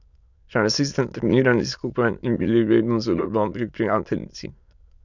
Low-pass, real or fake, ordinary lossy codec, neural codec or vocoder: 7.2 kHz; fake; none; autoencoder, 22.05 kHz, a latent of 192 numbers a frame, VITS, trained on many speakers